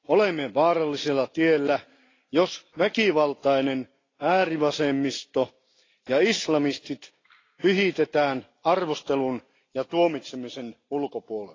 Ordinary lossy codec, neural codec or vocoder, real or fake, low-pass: AAC, 32 kbps; none; real; 7.2 kHz